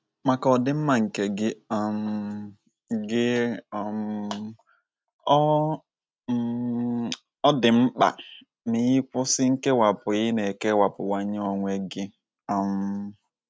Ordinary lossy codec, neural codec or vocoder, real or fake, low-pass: none; none; real; none